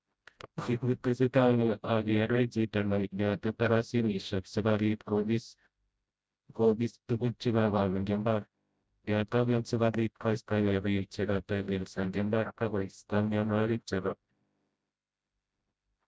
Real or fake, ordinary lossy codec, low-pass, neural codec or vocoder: fake; none; none; codec, 16 kHz, 0.5 kbps, FreqCodec, smaller model